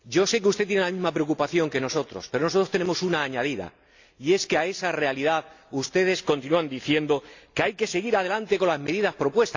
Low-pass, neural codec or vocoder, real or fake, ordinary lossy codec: 7.2 kHz; none; real; AAC, 48 kbps